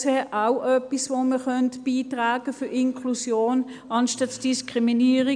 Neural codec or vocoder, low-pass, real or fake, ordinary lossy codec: none; 9.9 kHz; real; none